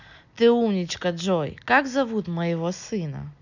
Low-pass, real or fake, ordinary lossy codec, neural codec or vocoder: 7.2 kHz; real; none; none